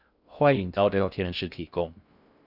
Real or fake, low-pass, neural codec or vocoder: fake; 5.4 kHz; codec, 16 kHz in and 24 kHz out, 0.6 kbps, FocalCodec, streaming, 2048 codes